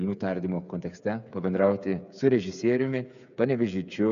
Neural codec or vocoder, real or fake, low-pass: codec, 16 kHz, 8 kbps, FreqCodec, smaller model; fake; 7.2 kHz